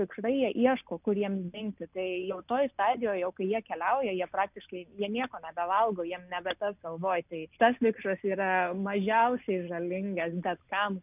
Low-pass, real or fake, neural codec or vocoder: 3.6 kHz; real; none